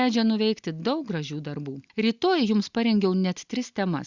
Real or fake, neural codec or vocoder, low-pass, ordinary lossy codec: real; none; 7.2 kHz; Opus, 64 kbps